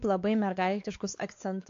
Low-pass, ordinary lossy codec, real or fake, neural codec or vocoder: 7.2 kHz; AAC, 48 kbps; fake; codec, 16 kHz, 4 kbps, X-Codec, WavLM features, trained on Multilingual LibriSpeech